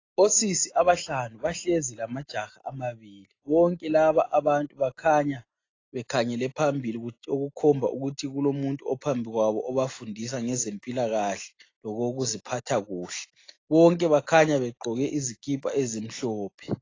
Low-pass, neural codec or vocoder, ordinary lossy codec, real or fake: 7.2 kHz; none; AAC, 32 kbps; real